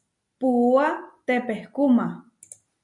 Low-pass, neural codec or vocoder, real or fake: 10.8 kHz; none; real